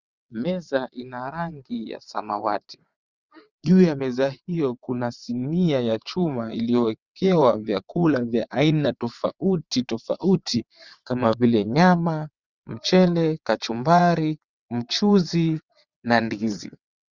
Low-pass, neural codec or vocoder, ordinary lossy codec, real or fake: 7.2 kHz; vocoder, 22.05 kHz, 80 mel bands, WaveNeXt; Opus, 64 kbps; fake